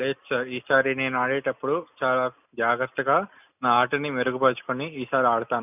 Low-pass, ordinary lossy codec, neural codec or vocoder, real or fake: 3.6 kHz; none; none; real